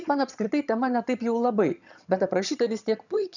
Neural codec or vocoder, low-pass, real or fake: vocoder, 22.05 kHz, 80 mel bands, HiFi-GAN; 7.2 kHz; fake